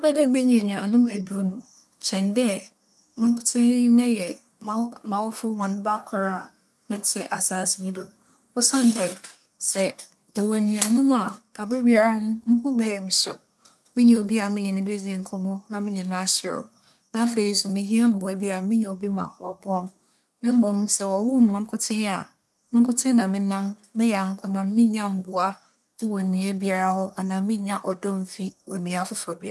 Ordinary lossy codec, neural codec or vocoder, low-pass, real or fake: none; codec, 24 kHz, 1 kbps, SNAC; none; fake